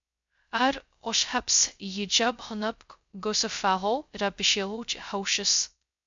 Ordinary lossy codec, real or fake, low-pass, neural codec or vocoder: MP3, 64 kbps; fake; 7.2 kHz; codec, 16 kHz, 0.2 kbps, FocalCodec